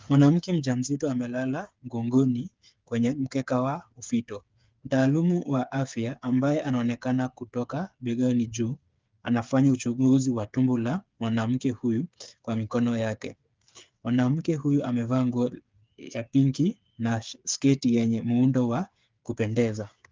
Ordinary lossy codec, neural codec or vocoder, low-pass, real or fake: Opus, 32 kbps; codec, 16 kHz, 8 kbps, FreqCodec, smaller model; 7.2 kHz; fake